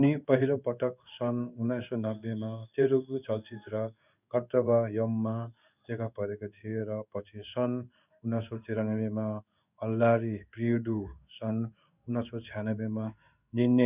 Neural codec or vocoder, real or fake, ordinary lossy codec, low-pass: codec, 16 kHz in and 24 kHz out, 1 kbps, XY-Tokenizer; fake; none; 3.6 kHz